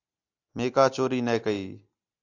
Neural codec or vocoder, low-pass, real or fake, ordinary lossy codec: none; 7.2 kHz; real; AAC, 48 kbps